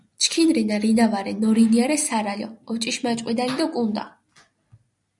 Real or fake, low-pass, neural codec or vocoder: real; 10.8 kHz; none